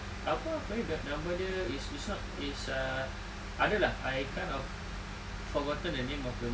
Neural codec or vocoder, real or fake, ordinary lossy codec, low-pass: none; real; none; none